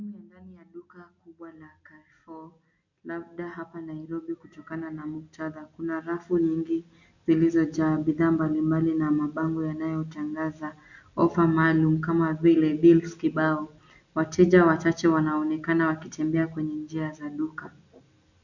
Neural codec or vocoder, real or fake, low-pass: none; real; 7.2 kHz